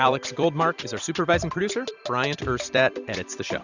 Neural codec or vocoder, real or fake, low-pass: vocoder, 44.1 kHz, 128 mel bands every 256 samples, BigVGAN v2; fake; 7.2 kHz